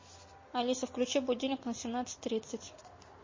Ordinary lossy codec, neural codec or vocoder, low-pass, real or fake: MP3, 32 kbps; none; 7.2 kHz; real